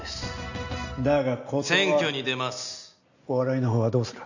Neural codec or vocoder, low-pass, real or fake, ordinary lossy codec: none; 7.2 kHz; real; none